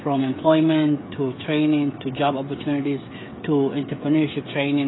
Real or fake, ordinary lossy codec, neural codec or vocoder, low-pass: fake; AAC, 16 kbps; codec, 16 kHz, 16 kbps, FreqCodec, smaller model; 7.2 kHz